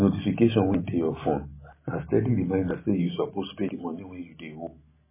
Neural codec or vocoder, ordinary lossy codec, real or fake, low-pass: none; MP3, 16 kbps; real; 3.6 kHz